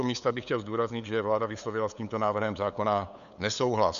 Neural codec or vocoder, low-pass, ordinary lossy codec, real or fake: codec, 16 kHz, 8 kbps, FunCodec, trained on LibriTTS, 25 frames a second; 7.2 kHz; MP3, 96 kbps; fake